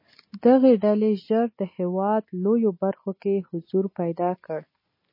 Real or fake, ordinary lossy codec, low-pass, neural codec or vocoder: real; MP3, 24 kbps; 5.4 kHz; none